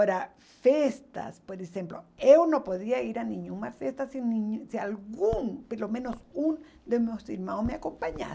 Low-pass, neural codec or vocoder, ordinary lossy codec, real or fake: none; none; none; real